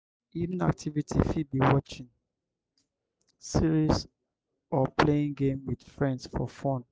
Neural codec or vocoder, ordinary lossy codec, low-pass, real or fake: none; none; none; real